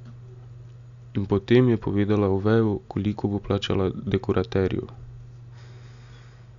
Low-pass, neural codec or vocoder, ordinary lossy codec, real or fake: 7.2 kHz; none; Opus, 64 kbps; real